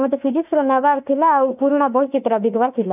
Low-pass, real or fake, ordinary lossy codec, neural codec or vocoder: 3.6 kHz; fake; none; autoencoder, 48 kHz, 32 numbers a frame, DAC-VAE, trained on Japanese speech